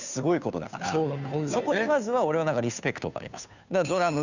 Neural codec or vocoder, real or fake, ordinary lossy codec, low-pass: codec, 16 kHz, 2 kbps, FunCodec, trained on Chinese and English, 25 frames a second; fake; none; 7.2 kHz